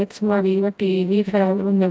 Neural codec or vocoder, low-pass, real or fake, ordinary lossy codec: codec, 16 kHz, 0.5 kbps, FreqCodec, smaller model; none; fake; none